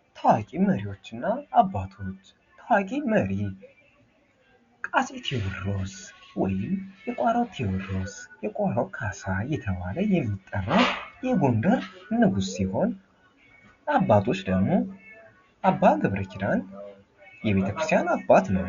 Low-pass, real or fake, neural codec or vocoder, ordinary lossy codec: 7.2 kHz; real; none; Opus, 64 kbps